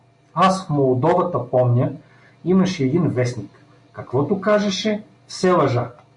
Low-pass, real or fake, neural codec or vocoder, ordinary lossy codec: 10.8 kHz; real; none; MP3, 64 kbps